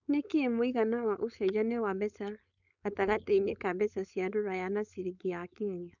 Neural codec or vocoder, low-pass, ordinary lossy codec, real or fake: codec, 16 kHz, 4.8 kbps, FACodec; 7.2 kHz; none; fake